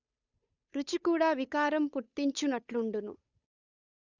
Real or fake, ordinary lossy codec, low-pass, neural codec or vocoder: fake; none; 7.2 kHz; codec, 16 kHz, 8 kbps, FunCodec, trained on Chinese and English, 25 frames a second